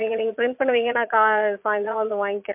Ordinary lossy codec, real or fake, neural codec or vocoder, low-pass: MP3, 32 kbps; fake; vocoder, 22.05 kHz, 80 mel bands, Vocos; 3.6 kHz